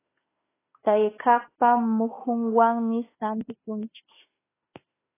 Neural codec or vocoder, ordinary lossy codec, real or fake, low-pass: autoencoder, 48 kHz, 32 numbers a frame, DAC-VAE, trained on Japanese speech; AAC, 16 kbps; fake; 3.6 kHz